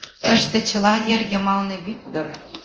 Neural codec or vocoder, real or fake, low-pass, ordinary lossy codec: codec, 24 kHz, 0.9 kbps, DualCodec; fake; 7.2 kHz; Opus, 24 kbps